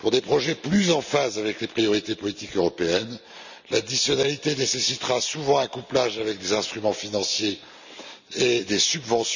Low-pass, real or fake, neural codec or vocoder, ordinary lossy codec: 7.2 kHz; real; none; none